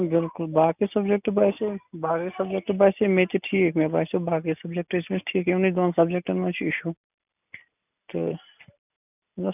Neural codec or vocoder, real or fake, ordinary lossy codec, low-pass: none; real; none; 3.6 kHz